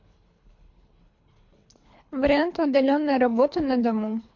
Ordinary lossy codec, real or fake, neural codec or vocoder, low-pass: MP3, 48 kbps; fake; codec, 24 kHz, 3 kbps, HILCodec; 7.2 kHz